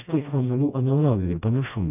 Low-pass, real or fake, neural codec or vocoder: 3.6 kHz; fake; codec, 16 kHz, 1 kbps, FreqCodec, smaller model